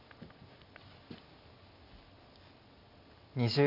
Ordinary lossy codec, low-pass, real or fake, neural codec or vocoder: none; 5.4 kHz; real; none